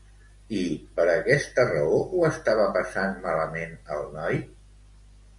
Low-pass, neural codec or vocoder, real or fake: 10.8 kHz; none; real